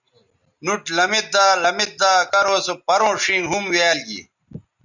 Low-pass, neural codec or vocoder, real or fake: 7.2 kHz; none; real